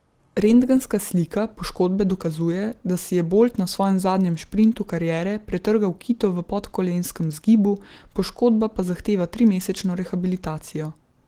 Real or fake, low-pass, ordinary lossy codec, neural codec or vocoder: real; 19.8 kHz; Opus, 16 kbps; none